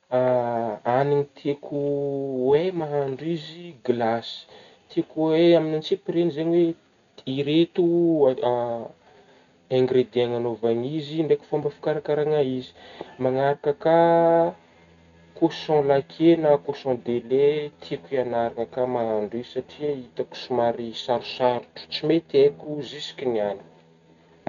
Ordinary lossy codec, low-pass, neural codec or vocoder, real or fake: none; 7.2 kHz; none; real